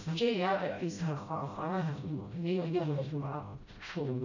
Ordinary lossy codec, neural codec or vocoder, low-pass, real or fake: none; codec, 16 kHz, 0.5 kbps, FreqCodec, smaller model; 7.2 kHz; fake